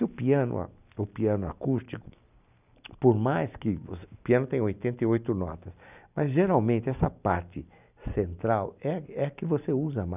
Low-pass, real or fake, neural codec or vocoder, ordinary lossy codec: 3.6 kHz; real; none; none